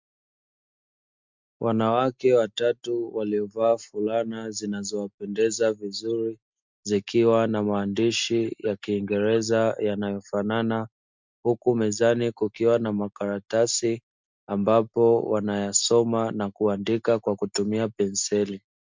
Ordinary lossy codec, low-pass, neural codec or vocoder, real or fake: MP3, 64 kbps; 7.2 kHz; none; real